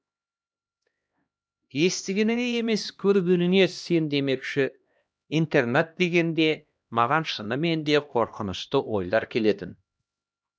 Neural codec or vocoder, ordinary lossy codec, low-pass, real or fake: codec, 16 kHz, 1 kbps, X-Codec, HuBERT features, trained on LibriSpeech; none; none; fake